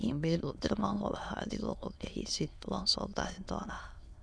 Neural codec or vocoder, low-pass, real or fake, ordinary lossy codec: autoencoder, 22.05 kHz, a latent of 192 numbers a frame, VITS, trained on many speakers; none; fake; none